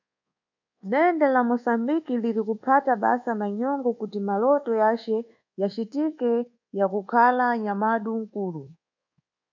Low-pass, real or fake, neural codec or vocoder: 7.2 kHz; fake; codec, 24 kHz, 1.2 kbps, DualCodec